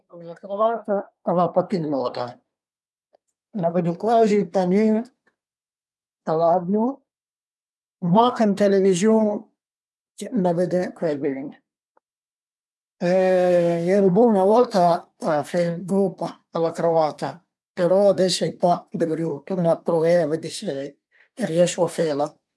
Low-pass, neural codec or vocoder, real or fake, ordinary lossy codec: none; codec, 24 kHz, 1 kbps, SNAC; fake; none